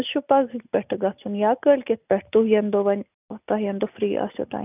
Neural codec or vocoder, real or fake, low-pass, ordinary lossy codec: none; real; 3.6 kHz; none